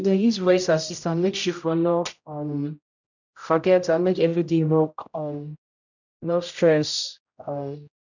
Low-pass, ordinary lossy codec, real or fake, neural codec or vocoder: 7.2 kHz; none; fake; codec, 16 kHz, 0.5 kbps, X-Codec, HuBERT features, trained on general audio